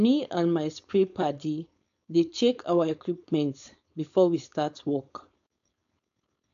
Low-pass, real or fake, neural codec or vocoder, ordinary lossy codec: 7.2 kHz; fake; codec, 16 kHz, 4.8 kbps, FACodec; AAC, 64 kbps